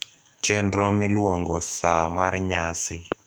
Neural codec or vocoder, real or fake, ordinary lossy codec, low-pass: codec, 44.1 kHz, 2.6 kbps, SNAC; fake; none; none